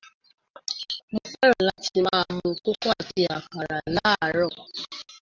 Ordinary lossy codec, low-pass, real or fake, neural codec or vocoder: Opus, 32 kbps; 7.2 kHz; real; none